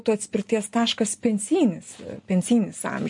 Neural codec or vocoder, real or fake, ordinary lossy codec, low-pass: none; real; MP3, 48 kbps; 10.8 kHz